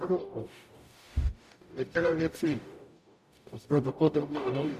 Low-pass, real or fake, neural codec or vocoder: 14.4 kHz; fake; codec, 44.1 kHz, 0.9 kbps, DAC